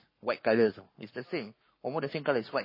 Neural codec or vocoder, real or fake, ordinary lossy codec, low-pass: codec, 44.1 kHz, 7.8 kbps, Pupu-Codec; fake; MP3, 24 kbps; 5.4 kHz